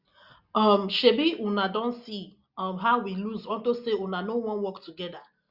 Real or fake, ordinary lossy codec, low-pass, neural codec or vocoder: real; Opus, 64 kbps; 5.4 kHz; none